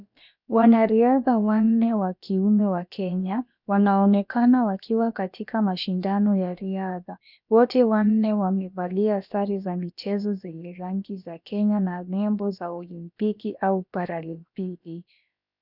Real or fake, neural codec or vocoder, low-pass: fake; codec, 16 kHz, about 1 kbps, DyCAST, with the encoder's durations; 5.4 kHz